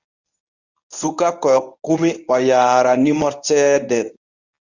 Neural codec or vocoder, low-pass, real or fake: codec, 24 kHz, 0.9 kbps, WavTokenizer, medium speech release version 1; 7.2 kHz; fake